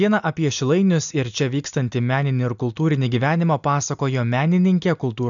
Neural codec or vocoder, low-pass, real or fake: none; 7.2 kHz; real